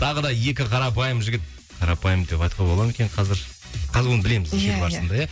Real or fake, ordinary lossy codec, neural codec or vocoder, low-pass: real; none; none; none